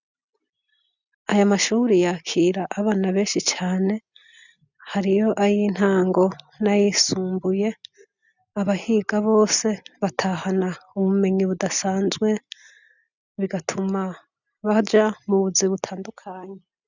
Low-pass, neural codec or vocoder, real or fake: 7.2 kHz; none; real